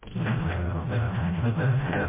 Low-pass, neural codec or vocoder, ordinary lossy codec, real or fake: 3.6 kHz; codec, 16 kHz, 0.5 kbps, FreqCodec, smaller model; MP3, 16 kbps; fake